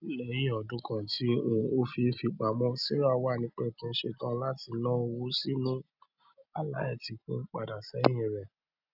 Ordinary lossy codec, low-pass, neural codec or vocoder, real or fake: none; 5.4 kHz; none; real